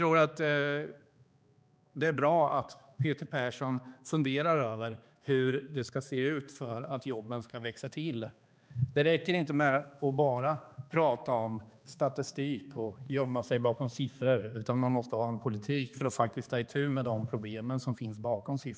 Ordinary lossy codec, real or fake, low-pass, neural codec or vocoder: none; fake; none; codec, 16 kHz, 2 kbps, X-Codec, HuBERT features, trained on balanced general audio